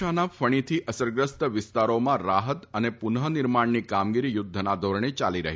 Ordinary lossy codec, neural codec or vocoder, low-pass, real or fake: none; none; none; real